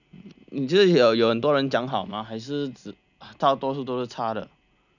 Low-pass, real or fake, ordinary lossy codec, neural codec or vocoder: 7.2 kHz; real; none; none